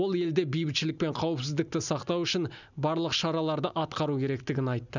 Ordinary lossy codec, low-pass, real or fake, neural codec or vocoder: none; 7.2 kHz; real; none